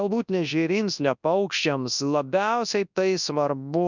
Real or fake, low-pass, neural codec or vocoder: fake; 7.2 kHz; codec, 24 kHz, 0.9 kbps, WavTokenizer, large speech release